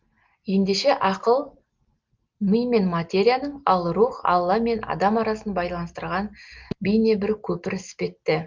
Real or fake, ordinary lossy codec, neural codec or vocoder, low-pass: real; Opus, 32 kbps; none; 7.2 kHz